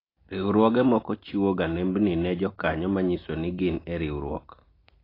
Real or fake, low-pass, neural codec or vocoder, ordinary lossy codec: real; 5.4 kHz; none; AAC, 24 kbps